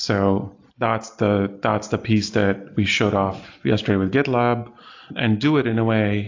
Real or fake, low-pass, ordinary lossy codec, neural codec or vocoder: real; 7.2 kHz; AAC, 48 kbps; none